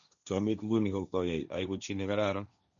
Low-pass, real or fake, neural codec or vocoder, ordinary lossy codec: 7.2 kHz; fake; codec, 16 kHz, 1.1 kbps, Voila-Tokenizer; none